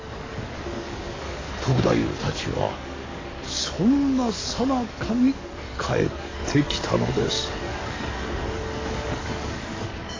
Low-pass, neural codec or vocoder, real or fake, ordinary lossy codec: 7.2 kHz; codec, 44.1 kHz, 7.8 kbps, DAC; fake; AAC, 32 kbps